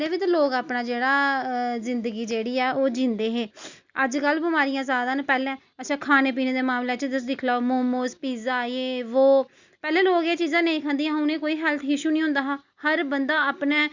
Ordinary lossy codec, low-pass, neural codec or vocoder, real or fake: Opus, 64 kbps; 7.2 kHz; none; real